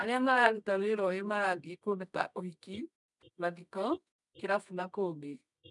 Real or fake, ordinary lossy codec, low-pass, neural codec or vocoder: fake; none; 10.8 kHz; codec, 24 kHz, 0.9 kbps, WavTokenizer, medium music audio release